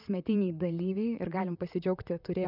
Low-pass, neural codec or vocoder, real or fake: 5.4 kHz; vocoder, 44.1 kHz, 128 mel bands, Pupu-Vocoder; fake